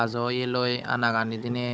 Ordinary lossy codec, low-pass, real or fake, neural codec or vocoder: none; none; fake; codec, 16 kHz, 16 kbps, FunCodec, trained on Chinese and English, 50 frames a second